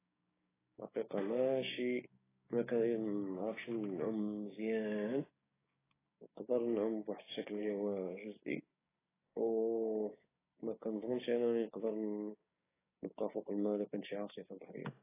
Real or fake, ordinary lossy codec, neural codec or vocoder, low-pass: fake; AAC, 16 kbps; codec, 16 kHz, 6 kbps, DAC; 3.6 kHz